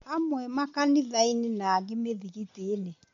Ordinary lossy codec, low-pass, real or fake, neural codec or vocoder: MP3, 48 kbps; 7.2 kHz; real; none